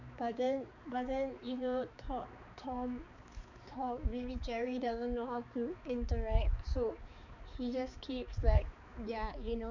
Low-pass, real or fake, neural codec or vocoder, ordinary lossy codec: 7.2 kHz; fake; codec, 16 kHz, 4 kbps, X-Codec, HuBERT features, trained on balanced general audio; none